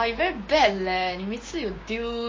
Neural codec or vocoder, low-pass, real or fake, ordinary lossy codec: codec, 16 kHz, 6 kbps, DAC; 7.2 kHz; fake; MP3, 32 kbps